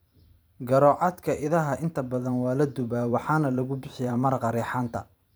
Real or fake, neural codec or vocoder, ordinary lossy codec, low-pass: fake; vocoder, 44.1 kHz, 128 mel bands every 512 samples, BigVGAN v2; none; none